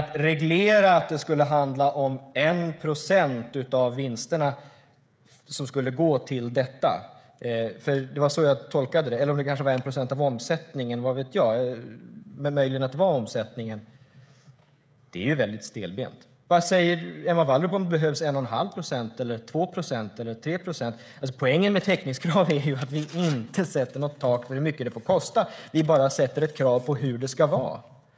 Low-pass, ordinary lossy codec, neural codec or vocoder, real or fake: none; none; codec, 16 kHz, 16 kbps, FreqCodec, smaller model; fake